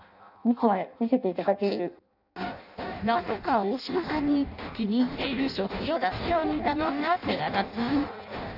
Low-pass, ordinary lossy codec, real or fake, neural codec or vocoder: 5.4 kHz; none; fake; codec, 16 kHz in and 24 kHz out, 0.6 kbps, FireRedTTS-2 codec